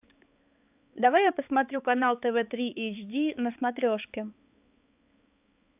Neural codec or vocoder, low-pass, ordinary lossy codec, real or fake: codec, 16 kHz, 4 kbps, X-Codec, HuBERT features, trained on balanced general audio; 3.6 kHz; AAC, 32 kbps; fake